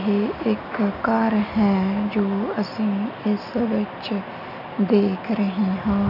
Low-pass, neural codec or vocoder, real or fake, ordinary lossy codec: 5.4 kHz; none; real; none